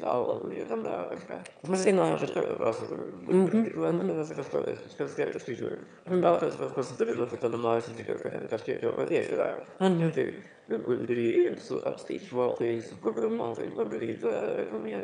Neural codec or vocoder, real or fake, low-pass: autoencoder, 22.05 kHz, a latent of 192 numbers a frame, VITS, trained on one speaker; fake; 9.9 kHz